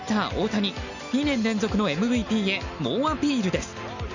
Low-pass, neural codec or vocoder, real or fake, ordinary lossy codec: 7.2 kHz; none; real; none